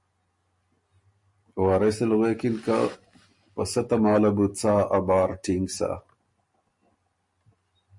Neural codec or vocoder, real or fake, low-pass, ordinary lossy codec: none; real; 10.8 kHz; MP3, 48 kbps